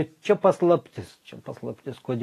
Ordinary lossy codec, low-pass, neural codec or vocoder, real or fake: AAC, 48 kbps; 14.4 kHz; none; real